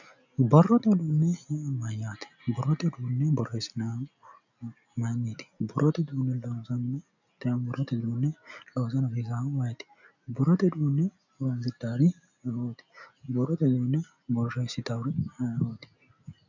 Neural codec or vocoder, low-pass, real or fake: none; 7.2 kHz; real